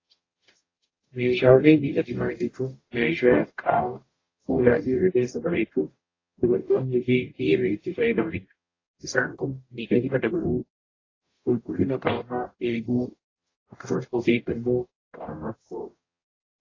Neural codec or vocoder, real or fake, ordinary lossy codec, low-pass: codec, 44.1 kHz, 0.9 kbps, DAC; fake; AAC, 32 kbps; 7.2 kHz